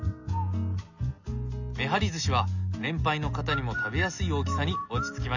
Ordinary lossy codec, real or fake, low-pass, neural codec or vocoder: none; real; 7.2 kHz; none